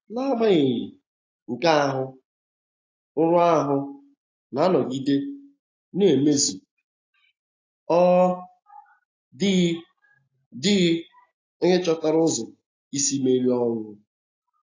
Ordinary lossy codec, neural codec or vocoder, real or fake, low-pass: AAC, 32 kbps; none; real; 7.2 kHz